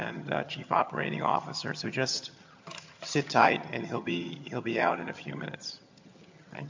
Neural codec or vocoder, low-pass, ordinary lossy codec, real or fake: vocoder, 22.05 kHz, 80 mel bands, HiFi-GAN; 7.2 kHz; MP3, 48 kbps; fake